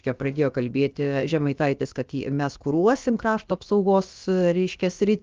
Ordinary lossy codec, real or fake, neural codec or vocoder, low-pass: Opus, 32 kbps; fake; codec, 16 kHz, about 1 kbps, DyCAST, with the encoder's durations; 7.2 kHz